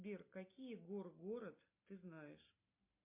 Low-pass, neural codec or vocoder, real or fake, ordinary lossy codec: 3.6 kHz; none; real; MP3, 24 kbps